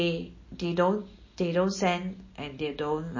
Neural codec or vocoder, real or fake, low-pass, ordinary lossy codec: none; real; 7.2 kHz; MP3, 32 kbps